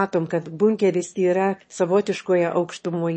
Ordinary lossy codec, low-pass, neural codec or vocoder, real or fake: MP3, 32 kbps; 9.9 kHz; autoencoder, 22.05 kHz, a latent of 192 numbers a frame, VITS, trained on one speaker; fake